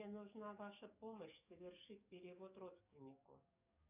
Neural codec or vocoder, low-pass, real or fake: codec, 44.1 kHz, 7.8 kbps, DAC; 3.6 kHz; fake